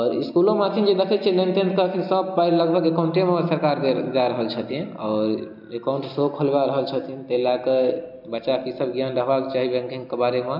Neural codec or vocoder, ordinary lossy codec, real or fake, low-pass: none; none; real; 5.4 kHz